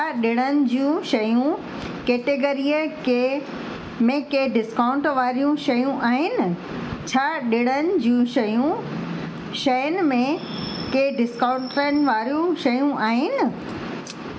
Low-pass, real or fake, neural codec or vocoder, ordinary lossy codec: none; real; none; none